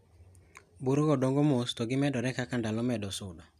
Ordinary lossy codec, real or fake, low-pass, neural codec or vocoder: none; real; 14.4 kHz; none